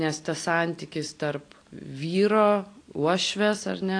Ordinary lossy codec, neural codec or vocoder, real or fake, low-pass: AAC, 48 kbps; none; real; 9.9 kHz